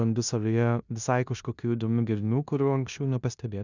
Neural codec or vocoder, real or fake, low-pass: codec, 16 kHz in and 24 kHz out, 0.9 kbps, LongCat-Audio-Codec, four codebook decoder; fake; 7.2 kHz